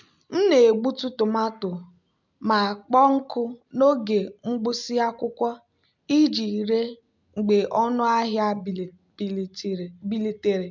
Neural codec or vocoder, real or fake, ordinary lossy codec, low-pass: none; real; MP3, 64 kbps; 7.2 kHz